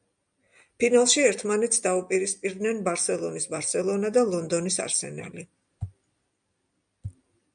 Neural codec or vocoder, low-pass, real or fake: none; 9.9 kHz; real